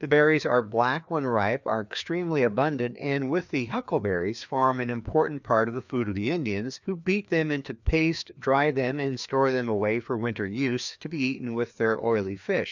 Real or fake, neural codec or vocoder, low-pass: fake; codec, 16 kHz, 2 kbps, FreqCodec, larger model; 7.2 kHz